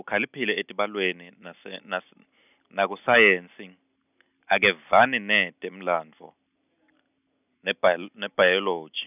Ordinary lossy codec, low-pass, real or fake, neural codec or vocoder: none; 3.6 kHz; real; none